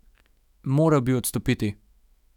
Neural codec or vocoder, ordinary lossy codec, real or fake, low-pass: autoencoder, 48 kHz, 128 numbers a frame, DAC-VAE, trained on Japanese speech; none; fake; 19.8 kHz